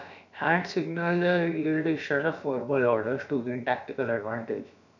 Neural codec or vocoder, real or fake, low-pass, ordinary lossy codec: codec, 16 kHz, about 1 kbps, DyCAST, with the encoder's durations; fake; 7.2 kHz; none